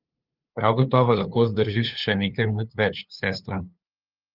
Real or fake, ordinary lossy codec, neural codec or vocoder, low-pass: fake; Opus, 24 kbps; codec, 16 kHz, 2 kbps, FunCodec, trained on LibriTTS, 25 frames a second; 5.4 kHz